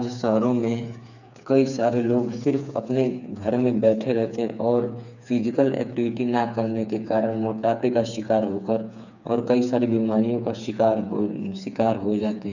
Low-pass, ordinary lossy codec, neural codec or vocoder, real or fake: 7.2 kHz; none; codec, 16 kHz, 4 kbps, FreqCodec, smaller model; fake